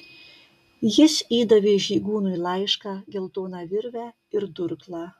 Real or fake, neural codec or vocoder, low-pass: real; none; 14.4 kHz